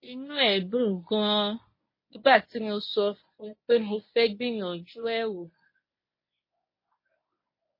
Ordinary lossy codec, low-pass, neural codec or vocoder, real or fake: MP3, 24 kbps; 5.4 kHz; codec, 24 kHz, 0.9 kbps, WavTokenizer, medium speech release version 1; fake